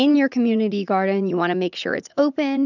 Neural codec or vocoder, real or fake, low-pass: vocoder, 44.1 kHz, 80 mel bands, Vocos; fake; 7.2 kHz